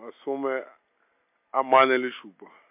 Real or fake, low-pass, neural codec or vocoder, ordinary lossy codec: real; 3.6 kHz; none; MP3, 32 kbps